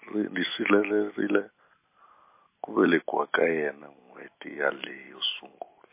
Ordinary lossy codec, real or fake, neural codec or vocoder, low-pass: MP3, 24 kbps; real; none; 3.6 kHz